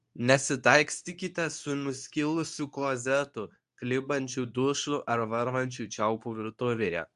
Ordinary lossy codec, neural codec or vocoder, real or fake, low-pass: AAC, 96 kbps; codec, 24 kHz, 0.9 kbps, WavTokenizer, medium speech release version 2; fake; 10.8 kHz